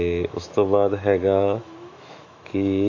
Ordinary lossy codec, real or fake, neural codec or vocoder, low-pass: AAC, 48 kbps; fake; autoencoder, 48 kHz, 128 numbers a frame, DAC-VAE, trained on Japanese speech; 7.2 kHz